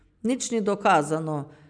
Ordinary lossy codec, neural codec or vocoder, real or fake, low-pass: none; none; real; 9.9 kHz